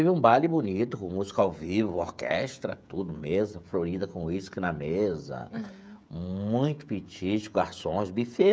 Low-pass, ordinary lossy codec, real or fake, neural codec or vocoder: none; none; fake; codec, 16 kHz, 16 kbps, FreqCodec, smaller model